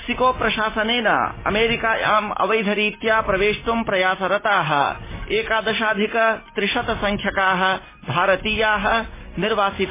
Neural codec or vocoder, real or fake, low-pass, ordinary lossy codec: none; real; 3.6 kHz; MP3, 16 kbps